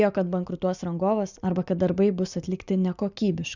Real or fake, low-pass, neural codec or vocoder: real; 7.2 kHz; none